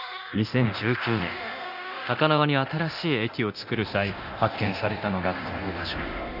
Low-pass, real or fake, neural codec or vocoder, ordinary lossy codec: 5.4 kHz; fake; codec, 24 kHz, 0.9 kbps, DualCodec; none